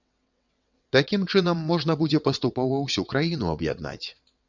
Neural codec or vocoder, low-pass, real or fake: vocoder, 22.05 kHz, 80 mel bands, WaveNeXt; 7.2 kHz; fake